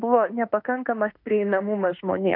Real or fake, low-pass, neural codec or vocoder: fake; 5.4 kHz; autoencoder, 48 kHz, 32 numbers a frame, DAC-VAE, trained on Japanese speech